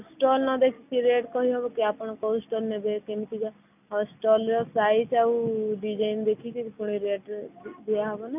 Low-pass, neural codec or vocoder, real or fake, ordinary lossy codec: 3.6 kHz; none; real; none